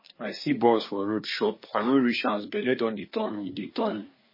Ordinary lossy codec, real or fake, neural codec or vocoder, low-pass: MP3, 24 kbps; fake; codec, 24 kHz, 1 kbps, SNAC; 5.4 kHz